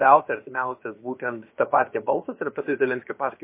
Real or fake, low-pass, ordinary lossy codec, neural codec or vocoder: fake; 3.6 kHz; MP3, 24 kbps; codec, 16 kHz, about 1 kbps, DyCAST, with the encoder's durations